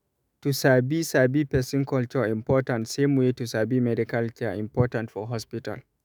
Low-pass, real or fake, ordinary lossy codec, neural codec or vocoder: none; fake; none; autoencoder, 48 kHz, 128 numbers a frame, DAC-VAE, trained on Japanese speech